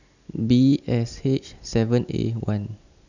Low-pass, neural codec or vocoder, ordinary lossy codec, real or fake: 7.2 kHz; none; none; real